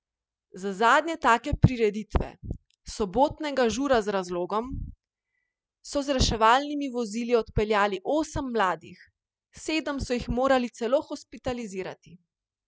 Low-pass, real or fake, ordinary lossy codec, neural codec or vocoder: none; real; none; none